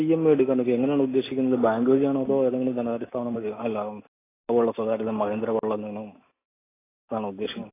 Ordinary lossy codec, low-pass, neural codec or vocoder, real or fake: AAC, 16 kbps; 3.6 kHz; none; real